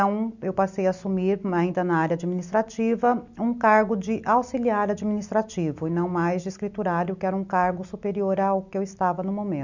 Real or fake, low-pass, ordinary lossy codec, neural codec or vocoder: real; 7.2 kHz; none; none